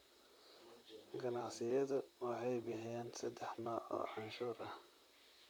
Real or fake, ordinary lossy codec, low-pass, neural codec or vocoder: fake; none; none; vocoder, 44.1 kHz, 128 mel bands, Pupu-Vocoder